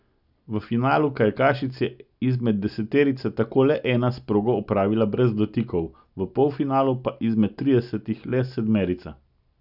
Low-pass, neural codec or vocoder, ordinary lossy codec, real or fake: 5.4 kHz; none; none; real